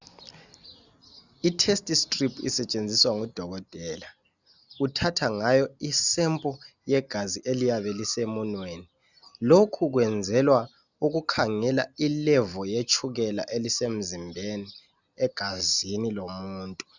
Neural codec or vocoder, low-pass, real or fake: none; 7.2 kHz; real